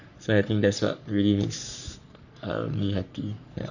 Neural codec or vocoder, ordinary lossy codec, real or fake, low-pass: codec, 44.1 kHz, 3.4 kbps, Pupu-Codec; none; fake; 7.2 kHz